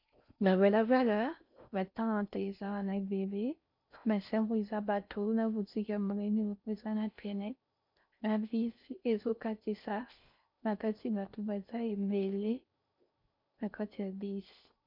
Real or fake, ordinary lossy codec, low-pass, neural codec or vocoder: fake; MP3, 48 kbps; 5.4 kHz; codec, 16 kHz in and 24 kHz out, 0.6 kbps, FocalCodec, streaming, 4096 codes